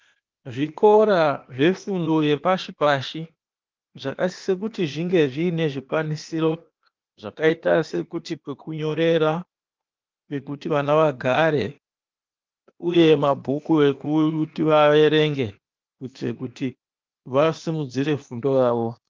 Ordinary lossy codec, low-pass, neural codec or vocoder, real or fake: Opus, 32 kbps; 7.2 kHz; codec, 16 kHz, 0.8 kbps, ZipCodec; fake